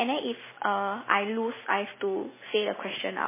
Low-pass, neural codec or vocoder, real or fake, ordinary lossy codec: 3.6 kHz; none; real; MP3, 16 kbps